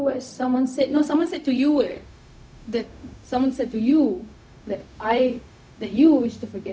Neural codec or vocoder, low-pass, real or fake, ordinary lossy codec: codec, 16 kHz, 0.4 kbps, LongCat-Audio-Codec; none; fake; none